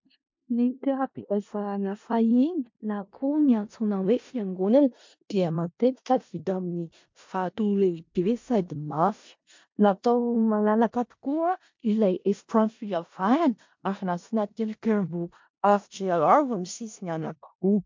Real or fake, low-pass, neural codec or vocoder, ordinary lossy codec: fake; 7.2 kHz; codec, 16 kHz in and 24 kHz out, 0.4 kbps, LongCat-Audio-Codec, four codebook decoder; MP3, 48 kbps